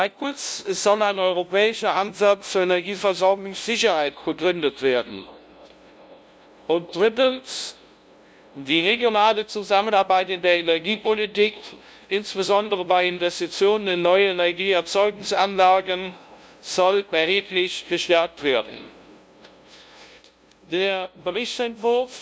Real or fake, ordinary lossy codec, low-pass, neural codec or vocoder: fake; none; none; codec, 16 kHz, 0.5 kbps, FunCodec, trained on LibriTTS, 25 frames a second